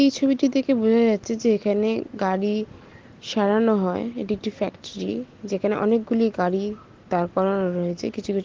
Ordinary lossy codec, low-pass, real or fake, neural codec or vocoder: Opus, 16 kbps; 7.2 kHz; real; none